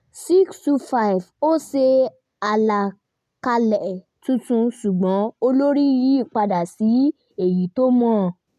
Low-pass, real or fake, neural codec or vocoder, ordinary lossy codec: 14.4 kHz; real; none; none